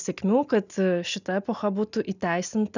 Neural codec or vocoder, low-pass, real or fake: none; 7.2 kHz; real